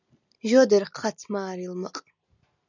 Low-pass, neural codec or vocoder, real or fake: 7.2 kHz; none; real